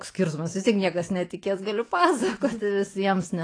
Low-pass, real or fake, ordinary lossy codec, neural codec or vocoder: 9.9 kHz; fake; AAC, 32 kbps; autoencoder, 48 kHz, 128 numbers a frame, DAC-VAE, trained on Japanese speech